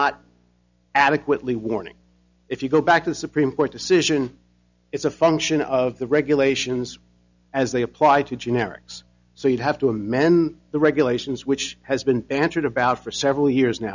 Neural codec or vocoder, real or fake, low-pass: none; real; 7.2 kHz